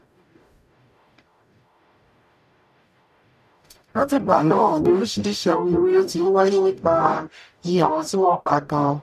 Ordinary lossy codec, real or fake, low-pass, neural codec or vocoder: none; fake; 14.4 kHz; codec, 44.1 kHz, 0.9 kbps, DAC